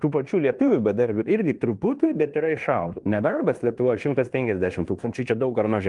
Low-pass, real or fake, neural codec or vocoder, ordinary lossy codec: 10.8 kHz; fake; codec, 16 kHz in and 24 kHz out, 0.9 kbps, LongCat-Audio-Codec, fine tuned four codebook decoder; Opus, 32 kbps